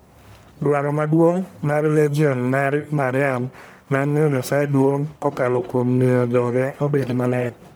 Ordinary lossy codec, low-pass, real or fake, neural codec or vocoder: none; none; fake; codec, 44.1 kHz, 1.7 kbps, Pupu-Codec